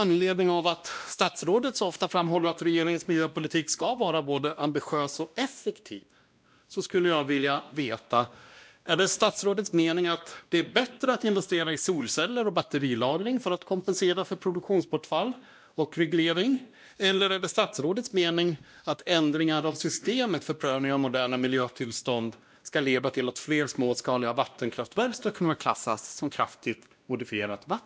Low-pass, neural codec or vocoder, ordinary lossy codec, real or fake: none; codec, 16 kHz, 1 kbps, X-Codec, WavLM features, trained on Multilingual LibriSpeech; none; fake